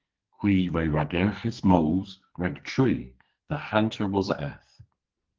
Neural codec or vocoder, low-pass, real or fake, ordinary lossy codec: codec, 44.1 kHz, 2.6 kbps, SNAC; 7.2 kHz; fake; Opus, 16 kbps